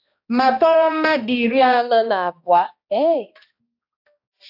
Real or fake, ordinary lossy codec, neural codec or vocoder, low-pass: fake; AAC, 48 kbps; codec, 16 kHz, 1 kbps, X-Codec, HuBERT features, trained on balanced general audio; 5.4 kHz